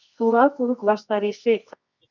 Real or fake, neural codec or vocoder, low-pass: fake; codec, 24 kHz, 0.9 kbps, WavTokenizer, medium music audio release; 7.2 kHz